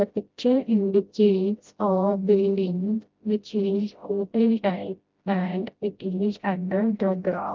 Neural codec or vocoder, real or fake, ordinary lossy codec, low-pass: codec, 16 kHz, 0.5 kbps, FreqCodec, smaller model; fake; Opus, 24 kbps; 7.2 kHz